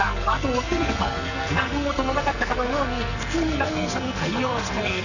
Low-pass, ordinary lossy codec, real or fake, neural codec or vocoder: 7.2 kHz; none; fake; codec, 44.1 kHz, 2.6 kbps, SNAC